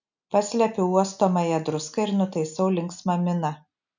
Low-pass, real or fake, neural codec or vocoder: 7.2 kHz; real; none